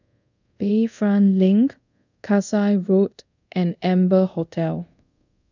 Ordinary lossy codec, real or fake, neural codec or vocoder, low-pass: none; fake; codec, 24 kHz, 0.5 kbps, DualCodec; 7.2 kHz